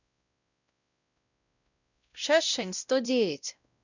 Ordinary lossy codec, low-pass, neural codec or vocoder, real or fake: none; 7.2 kHz; codec, 16 kHz, 0.5 kbps, X-Codec, WavLM features, trained on Multilingual LibriSpeech; fake